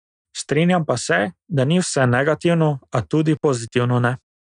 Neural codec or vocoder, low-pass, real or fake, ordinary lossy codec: none; 9.9 kHz; real; none